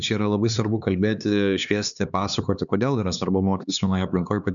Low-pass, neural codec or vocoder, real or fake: 7.2 kHz; codec, 16 kHz, 2 kbps, X-Codec, HuBERT features, trained on LibriSpeech; fake